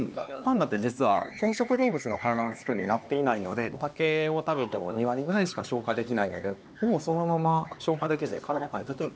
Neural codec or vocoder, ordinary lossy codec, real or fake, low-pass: codec, 16 kHz, 2 kbps, X-Codec, HuBERT features, trained on LibriSpeech; none; fake; none